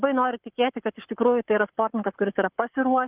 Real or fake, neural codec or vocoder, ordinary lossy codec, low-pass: fake; codec, 44.1 kHz, 7.8 kbps, Pupu-Codec; Opus, 32 kbps; 3.6 kHz